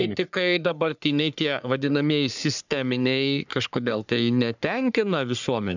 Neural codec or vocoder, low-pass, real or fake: codec, 44.1 kHz, 3.4 kbps, Pupu-Codec; 7.2 kHz; fake